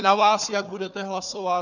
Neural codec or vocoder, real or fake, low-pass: codec, 16 kHz, 4 kbps, FunCodec, trained on Chinese and English, 50 frames a second; fake; 7.2 kHz